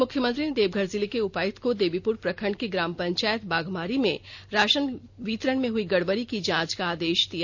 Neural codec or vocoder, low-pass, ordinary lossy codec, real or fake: none; none; none; real